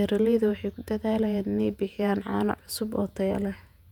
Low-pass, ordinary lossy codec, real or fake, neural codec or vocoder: 19.8 kHz; none; fake; vocoder, 48 kHz, 128 mel bands, Vocos